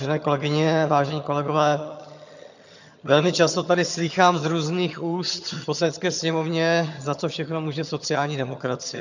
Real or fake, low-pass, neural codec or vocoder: fake; 7.2 kHz; vocoder, 22.05 kHz, 80 mel bands, HiFi-GAN